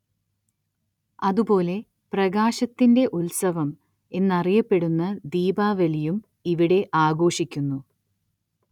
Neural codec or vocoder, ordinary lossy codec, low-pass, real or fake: none; none; 19.8 kHz; real